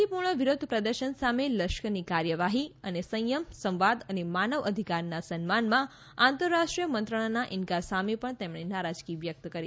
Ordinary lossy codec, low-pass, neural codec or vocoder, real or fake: none; none; none; real